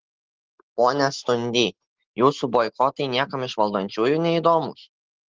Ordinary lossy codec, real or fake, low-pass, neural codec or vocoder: Opus, 16 kbps; real; 7.2 kHz; none